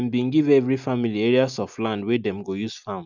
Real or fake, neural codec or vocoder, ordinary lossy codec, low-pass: real; none; none; 7.2 kHz